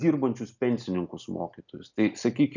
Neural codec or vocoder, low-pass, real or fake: none; 7.2 kHz; real